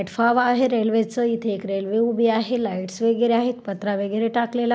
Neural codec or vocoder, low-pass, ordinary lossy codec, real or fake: none; none; none; real